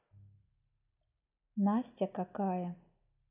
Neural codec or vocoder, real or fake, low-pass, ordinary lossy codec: none; real; 3.6 kHz; none